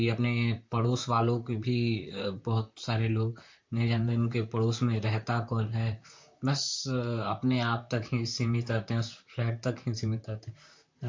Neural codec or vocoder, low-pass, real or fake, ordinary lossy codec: vocoder, 44.1 kHz, 128 mel bands, Pupu-Vocoder; 7.2 kHz; fake; MP3, 48 kbps